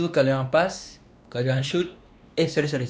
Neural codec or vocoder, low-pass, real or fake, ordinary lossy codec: codec, 16 kHz, 2 kbps, X-Codec, WavLM features, trained on Multilingual LibriSpeech; none; fake; none